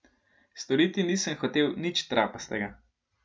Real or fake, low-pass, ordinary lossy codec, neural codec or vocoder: real; none; none; none